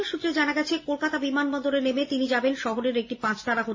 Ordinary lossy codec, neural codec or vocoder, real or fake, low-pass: MP3, 32 kbps; none; real; 7.2 kHz